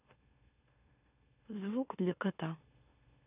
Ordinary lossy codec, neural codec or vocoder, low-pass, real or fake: none; autoencoder, 44.1 kHz, a latent of 192 numbers a frame, MeloTTS; 3.6 kHz; fake